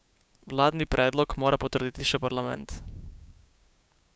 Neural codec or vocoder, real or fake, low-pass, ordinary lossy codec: codec, 16 kHz, 6 kbps, DAC; fake; none; none